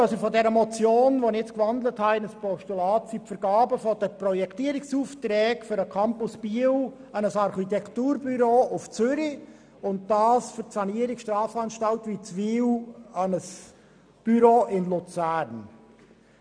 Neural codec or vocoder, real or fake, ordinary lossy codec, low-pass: none; real; none; 9.9 kHz